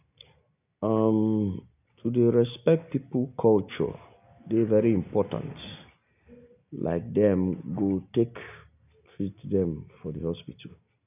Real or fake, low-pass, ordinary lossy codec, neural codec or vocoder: real; 3.6 kHz; none; none